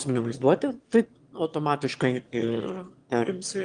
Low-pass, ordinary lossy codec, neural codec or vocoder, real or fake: 9.9 kHz; Opus, 24 kbps; autoencoder, 22.05 kHz, a latent of 192 numbers a frame, VITS, trained on one speaker; fake